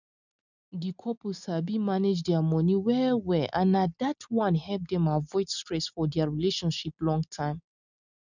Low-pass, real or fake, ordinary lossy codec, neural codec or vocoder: 7.2 kHz; real; none; none